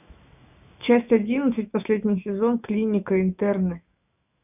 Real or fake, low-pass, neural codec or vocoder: fake; 3.6 kHz; autoencoder, 48 kHz, 128 numbers a frame, DAC-VAE, trained on Japanese speech